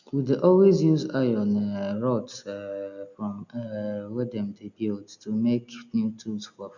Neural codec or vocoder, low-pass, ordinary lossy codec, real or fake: none; 7.2 kHz; none; real